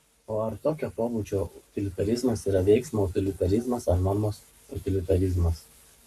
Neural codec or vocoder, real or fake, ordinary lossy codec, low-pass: codec, 44.1 kHz, 7.8 kbps, Pupu-Codec; fake; AAC, 96 kbps; 14.4 kHz